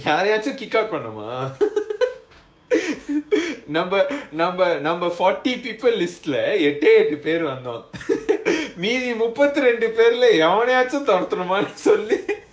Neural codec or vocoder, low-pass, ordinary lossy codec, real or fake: codec, 16 kHz, 6 kbps, DAC; none; none; fake